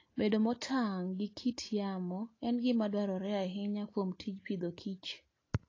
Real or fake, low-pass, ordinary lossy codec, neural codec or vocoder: real; 7.2 kHz; AAC, 32 kbps; none